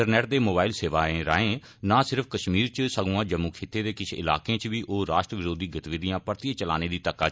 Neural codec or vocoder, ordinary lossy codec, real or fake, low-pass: none; none; real; none